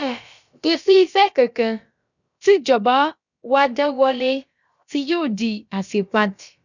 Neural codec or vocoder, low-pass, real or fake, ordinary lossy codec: codec, 16 kHz, about 1 kbps, DyCAST, with the encoder's durations; 7.2 kHz; fake; none